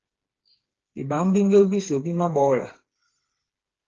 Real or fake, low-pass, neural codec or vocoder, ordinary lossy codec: fake; 7.2 kHz; codec, 16 kHz, 4 kbps, FreqCodec, smaller model; Opus, 16 kbps